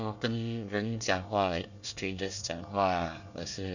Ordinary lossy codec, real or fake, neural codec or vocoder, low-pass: none; fake; codec, 24 kHz, 1 kbps, SNAC; 7.2 kHz